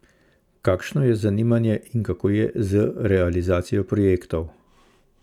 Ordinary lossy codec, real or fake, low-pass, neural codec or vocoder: none; real; 19.8 kHz; none